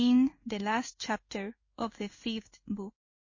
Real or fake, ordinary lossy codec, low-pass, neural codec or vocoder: fake; MP3, 32 kbps; 7.2 kHz; codec, 16 kHz in and 24 kHz out, 1 kbps, XY-Tokenizer